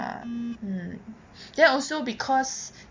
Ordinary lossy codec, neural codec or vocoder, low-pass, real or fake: MP3, 48 kbps; none; 7.2 kHz; real